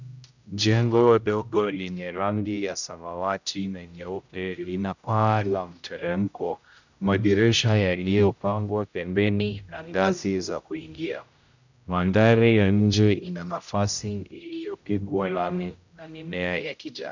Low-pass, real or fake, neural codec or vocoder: 7.2 kHz; fake; codec, 16 kHz, 0.5 kbps, X-Codec, HuBERT features, trained on general audio